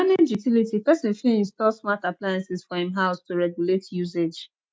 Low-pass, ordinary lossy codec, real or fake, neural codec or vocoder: none; none; real; none